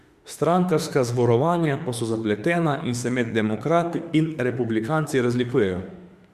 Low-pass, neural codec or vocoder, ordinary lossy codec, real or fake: 14.4 kHz; autoencoder, 48 kHz, 32 numbers a frame, DAC-VAE, trained on Japanese speech; Opus, 64 kbps; fake